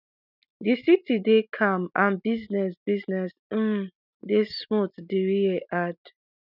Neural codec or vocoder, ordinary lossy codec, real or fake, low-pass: none; none; real; 5.4 kHz